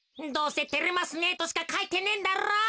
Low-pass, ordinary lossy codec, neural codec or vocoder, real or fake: none; none; none; real